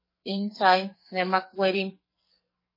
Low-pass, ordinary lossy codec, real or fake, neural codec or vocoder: 5.4 kHz; MP3, 24 kbps; fake; codec, 44.1 kHz, 2.6 kbps, SNAC